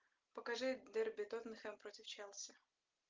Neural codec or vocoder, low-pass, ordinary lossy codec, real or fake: none; 7.2 kHz; Opus, 32 kbps; real